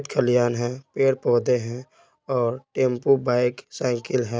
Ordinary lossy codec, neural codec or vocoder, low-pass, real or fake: none; none; none; real